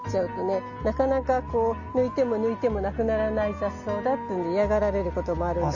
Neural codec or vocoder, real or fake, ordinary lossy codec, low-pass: none; real; none; 7.2 kHz